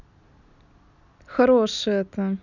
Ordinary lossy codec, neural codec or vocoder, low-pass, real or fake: none; none; 7.2 kHz; real